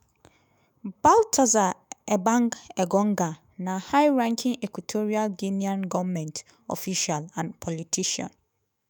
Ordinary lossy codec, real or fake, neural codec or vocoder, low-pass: none; fake; autoencoder, 48 kHz, 128 numbers a frame, DAC-VAE, trained on Japanese speech; none